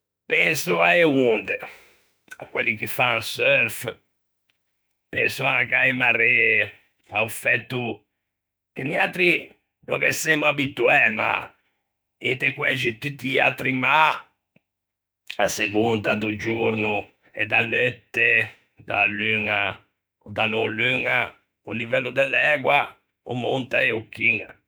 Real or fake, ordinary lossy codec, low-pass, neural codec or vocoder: fake; none; none; autoencoder, 48 kHz, 32 numbers a frame, DAC-VAE, trained on Japanese speech